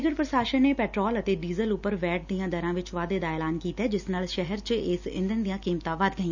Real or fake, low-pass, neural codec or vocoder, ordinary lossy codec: real; 7.2 kHz; none; none